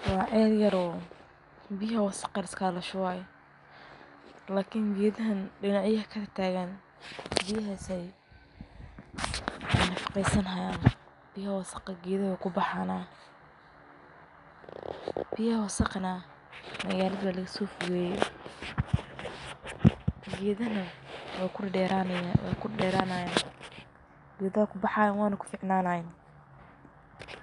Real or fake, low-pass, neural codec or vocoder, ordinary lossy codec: real; 10.8 kHz; none; none